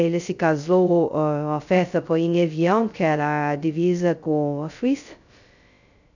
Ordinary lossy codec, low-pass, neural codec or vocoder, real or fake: none; 7.2 kHz; codec, 16 kHz, 0.2 kbps, FocalCodec; fake